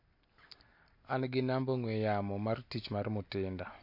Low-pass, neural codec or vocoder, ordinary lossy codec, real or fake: 5.4 kHz; none; MP3, 32 kbps; real